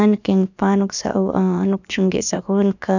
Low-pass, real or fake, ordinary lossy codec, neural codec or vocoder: 7.2 kHz; fake; none; codec, 16 kHz, about 1 kbps, DyCAST, with the encoder's durations